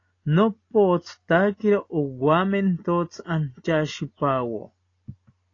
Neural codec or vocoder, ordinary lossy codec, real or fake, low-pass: none; AAC, 32 kbps; real; 7.2 kHz